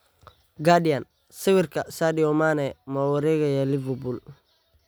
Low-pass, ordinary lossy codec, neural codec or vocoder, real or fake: none; none; none; real